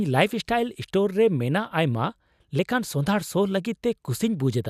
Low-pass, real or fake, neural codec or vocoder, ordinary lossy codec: 14.4 kHz; real; none; none